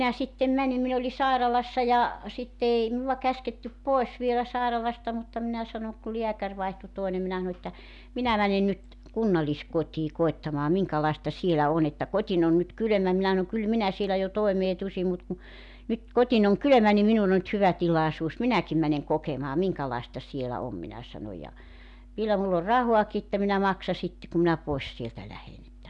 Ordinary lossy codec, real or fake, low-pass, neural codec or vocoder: none; real; 10.8 kHz; none